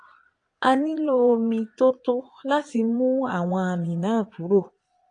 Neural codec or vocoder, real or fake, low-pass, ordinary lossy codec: vocoder, 22.05 kHz, 80 mel bands, Vocos; fake; 9.9 kHz; AAC, 48 kbps